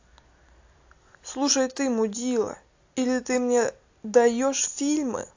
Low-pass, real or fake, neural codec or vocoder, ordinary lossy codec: 7.2 kHz; real; none; AAC, 48 kbps